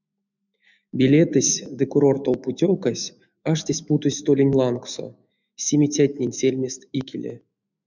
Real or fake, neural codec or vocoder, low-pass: fake; autoencoder, 48 kHz, 128 numbers a frame, DAC-VAE, trained on Japanese speech; 7.2 kHz